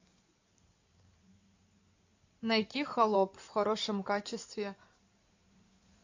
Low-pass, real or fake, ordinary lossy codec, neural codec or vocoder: 7.2 kHz; fake; AAC, 48 kbps; codec, 16 kHz in and 24 kHz out, 2.2 kbps, FireRedTTS-2 codec